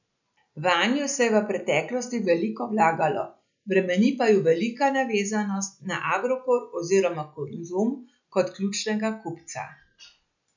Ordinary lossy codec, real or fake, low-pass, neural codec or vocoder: none; real; 7.2 kHz; none